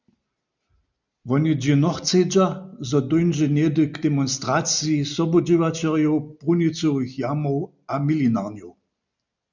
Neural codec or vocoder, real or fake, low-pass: none; real; 7.2 kHz